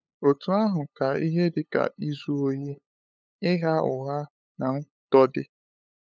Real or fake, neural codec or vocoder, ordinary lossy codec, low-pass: fake; codec, 16 kHz, 8 kbps, FunCodec, trained on LibriTTS, 25 frames a second; none; none